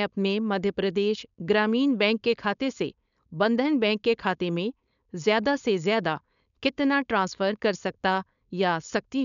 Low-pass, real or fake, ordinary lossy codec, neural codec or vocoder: 7.2 kHz; fake; none; codec, 16 kHz, 4.8 kbps, FACodec